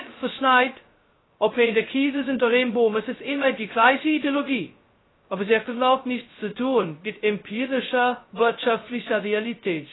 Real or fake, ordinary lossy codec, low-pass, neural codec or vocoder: fake; AAC, 16 kbps; 7.2 kHz; codec, 16 kHz, 0.2 kbps, FocalCodec